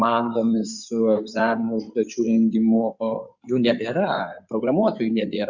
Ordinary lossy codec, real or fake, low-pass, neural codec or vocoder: Opus, 64 kbps; fake; 7.2 kHz; codec, 16 kHz in and 24 kHz out, 2.2 kbps, FireRedTTS-2 codec